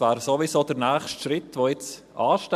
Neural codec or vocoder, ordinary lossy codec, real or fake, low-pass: none; MP3, 96 kbps; real; 14.4 kHz